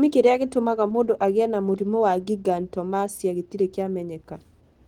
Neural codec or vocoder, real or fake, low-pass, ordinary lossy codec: none; real; 19.8 kHz; Opus, 16 kbps